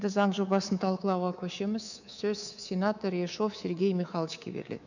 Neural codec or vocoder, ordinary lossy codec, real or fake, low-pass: codec, 24 kHz, 3.1 kbps, DualCodec; none; fake; 7.2 kHz